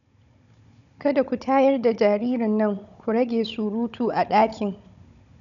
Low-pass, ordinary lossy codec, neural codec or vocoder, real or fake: 7.2 kHz; none; codec, 16 kHz, 16 kbps, FunCodec, trained on Chinese and English, 50 frames a second; fake